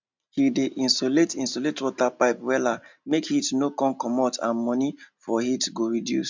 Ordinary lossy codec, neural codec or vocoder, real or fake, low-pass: MP3, 64 kbps; none; real; 7.2 kHz